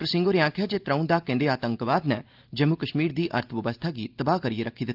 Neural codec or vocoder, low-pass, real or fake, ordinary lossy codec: none; 5.4 kHz; real; Opus, 32 kbps